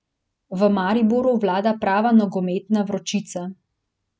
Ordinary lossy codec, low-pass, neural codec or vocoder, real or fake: none; none; none; real